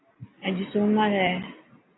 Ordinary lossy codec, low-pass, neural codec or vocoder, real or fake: AAC, 16 kbps; 7.2 kHz; none; real